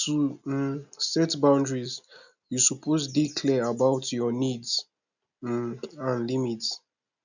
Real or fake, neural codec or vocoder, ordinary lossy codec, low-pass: real; none; none; 7.2 kHz